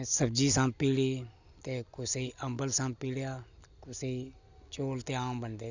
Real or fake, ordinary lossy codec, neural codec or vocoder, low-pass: real; none; none; 7.2 kHz